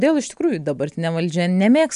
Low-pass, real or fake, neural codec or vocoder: 10.8 kHz; real; none